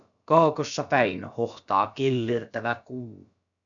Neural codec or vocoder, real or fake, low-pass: codec, 16 kHz, about 1 kbps, DyCAST, with the encoder's durations; fake; 7.2 kHz